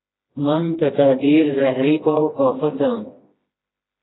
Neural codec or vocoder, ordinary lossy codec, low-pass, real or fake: codec, 16 kHz, 1 kbps, FreqCodec, smaller model; AAC, 16 kbps; 7.2 kHz; fake